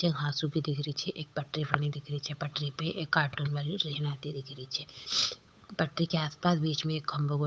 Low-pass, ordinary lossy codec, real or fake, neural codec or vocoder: none; none; fake; codec, 16 kHz, 8 kbps, FunCodec, trained on Chinese and English, 25 frames a second